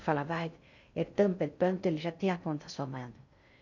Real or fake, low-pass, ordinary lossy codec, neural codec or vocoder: fake; 7.2 kHz; none; codec, 16 kHz in and 24 kHz out, 0.6 kbps, FocalCodec, streaming, 2048 codes